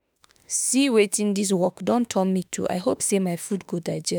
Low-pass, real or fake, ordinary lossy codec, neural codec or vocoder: none; fake; none; autoencoder, 48 kHz, 32 numbers a frame, DAC-VAE, trained on Japanese speech